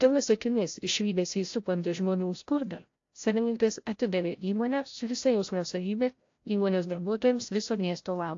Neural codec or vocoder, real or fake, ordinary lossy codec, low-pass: codec, 16 kHz, 0.5 kbps, FreqCodec, larger model; fake; AAC, 48 kbps; 7.2 kHz